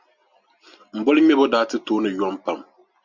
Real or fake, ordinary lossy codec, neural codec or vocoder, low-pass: real; Opus, 64 kbps; none; 7.2 kHz